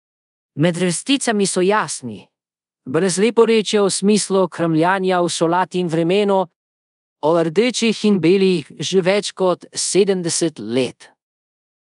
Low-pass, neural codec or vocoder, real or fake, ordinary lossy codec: 10.8 kHz; codec, 24 kHz, 0.5 kbps, DualCodec; fake; none